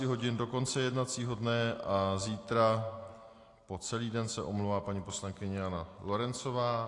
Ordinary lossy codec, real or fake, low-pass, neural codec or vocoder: AAC, 48 kbps; real; 10.8 kHz; none